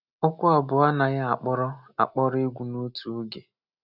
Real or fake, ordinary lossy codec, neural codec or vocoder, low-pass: real; none; none; 5.4 kHz